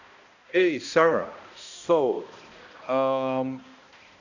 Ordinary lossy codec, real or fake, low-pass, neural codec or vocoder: none; fake; 7.2 kHz; codec, 16 kHz, 1 kbps, X-Codec, HuBERT features, trained on balanced general audio